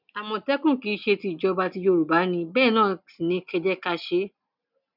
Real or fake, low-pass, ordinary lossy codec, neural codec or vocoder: real; 5.4 kHz; none; none